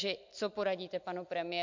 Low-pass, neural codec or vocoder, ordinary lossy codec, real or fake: 7.2 kHz; none; MP3, 64 kbps; real